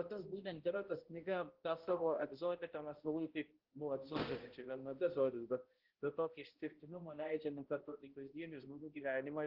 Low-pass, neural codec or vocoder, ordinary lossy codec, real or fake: 5.4 kHz; codec, 16 kHz, 0.5 kbps, X-Codec, HuBERT features, trained on balanced general audio; Opus, 16 kbps; fake